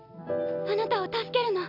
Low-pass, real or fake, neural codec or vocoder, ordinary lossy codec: 5.4 kHz; real; none; AAC, 48 kbps